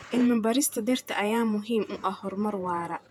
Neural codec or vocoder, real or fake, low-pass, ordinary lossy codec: vocoder, 48 kHz, 128 mel bands, Vocos; fake; 19.8 kHz; none